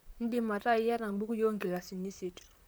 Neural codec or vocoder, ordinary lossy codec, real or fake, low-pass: vocoder, 44.1 kHz, 128 mel bands, Pupu-Vocoder; none; fake; none